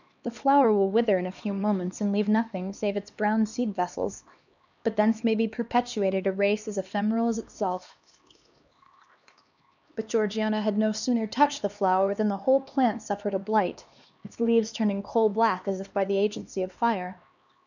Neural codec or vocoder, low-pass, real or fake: codec, 16 kHz, 2 kbps, X-Codec, HuBERT features, trained on LibriSpeech; 7.2 kHz; fake